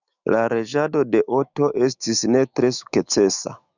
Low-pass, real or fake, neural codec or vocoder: 7.2 kHz; fake; autoencoder, 48 kHz, 128 numbers a frame, DAC-VAE, trained on Japanese speech